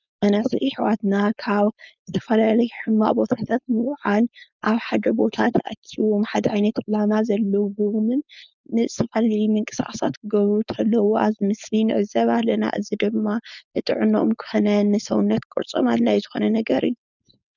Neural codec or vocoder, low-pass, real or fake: codec, 16 kHz, 4.8 kbps, FACodec; 7.2 kHz; fake